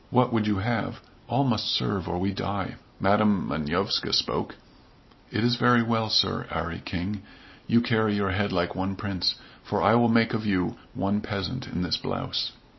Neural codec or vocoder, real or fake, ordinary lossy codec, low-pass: none; real; MP3, 24 kbps; 7.2 kHz